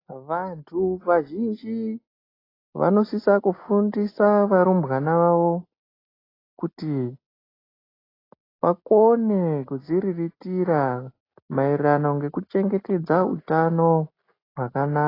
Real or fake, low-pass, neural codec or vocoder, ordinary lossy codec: real; 5.4 kHz; none; AAC, 24 kbps